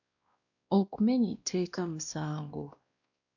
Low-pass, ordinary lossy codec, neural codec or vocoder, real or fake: 7.2 kHz; AAC, 48 kbps; codec, 16 kHz, 1 kbps, X-Codec, WavLM features, trained on Multilingual LibriSpeech; fake